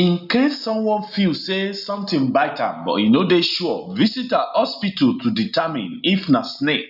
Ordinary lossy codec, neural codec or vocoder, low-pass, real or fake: none; none; 5.4 kHz; real